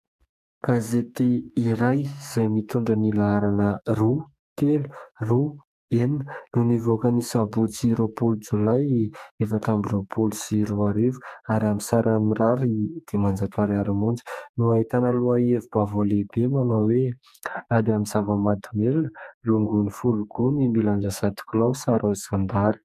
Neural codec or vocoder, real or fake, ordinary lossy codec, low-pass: codec, 44.1 kHz, 2.6 kbps, SNAC; fake; MP3, 96 kbps; 14.4 kHz